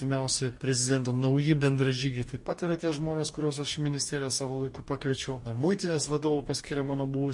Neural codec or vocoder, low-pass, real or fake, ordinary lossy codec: codec, 44.1 kHz, 2.6 kbps, DAC; 10.8 kHz; fake; MP3, 48 kbps